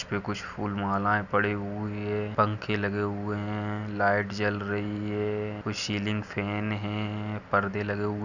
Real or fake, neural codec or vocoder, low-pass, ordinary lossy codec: real; none; 7.2 kHz; none